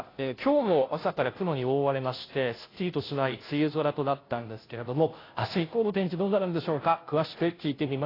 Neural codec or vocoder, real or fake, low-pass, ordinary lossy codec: codec, 16 kHz, 0.5 kbps, FunCodec, trained on Chinese and English, 25 frames a second; fake; 5.4 kHz; AAC, 24 kbps